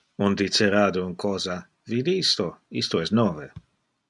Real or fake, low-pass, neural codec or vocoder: real; 10.8 kHz; none